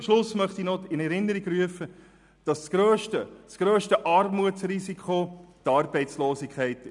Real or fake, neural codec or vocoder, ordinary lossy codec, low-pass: real; none; none; 10.8 kHz